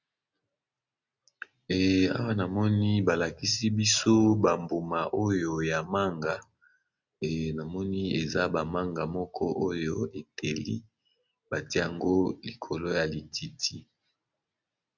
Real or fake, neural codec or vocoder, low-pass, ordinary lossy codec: real; none; 7.2 kHz; Opus, 64 kbps